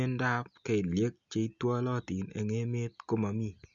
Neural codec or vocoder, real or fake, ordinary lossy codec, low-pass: none; real; Opus, 64 kbps; 7.2 kHz